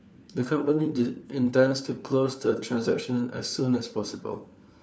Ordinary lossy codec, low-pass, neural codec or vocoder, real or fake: none; none; codec, 16 kHz, 4 kbps, FunCodec, trained on LibriTTS, 50 frames a second; fake